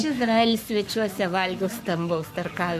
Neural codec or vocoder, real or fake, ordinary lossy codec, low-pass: codec, 44.1 kHz, 7.8 kbps, Pupu-Codec; fake; MP3, 96 kbps; 14.4 kHz